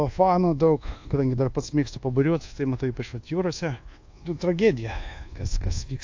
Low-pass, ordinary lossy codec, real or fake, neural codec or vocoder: 7.2 kHz; AAC, 48 kbps; fake; codec, 24 kHz, 1.2 kbps, DualCodec